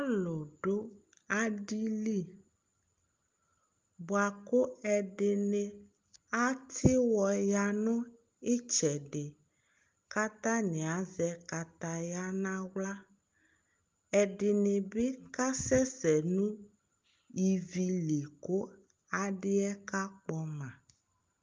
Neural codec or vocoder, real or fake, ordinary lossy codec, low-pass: none; real; Opus, 32 kbps; 7.2 kHz